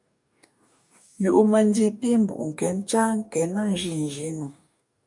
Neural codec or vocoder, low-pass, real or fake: codec, 44.1 kHz, 2.6 kbps, DAC; 10.8 kHz; fake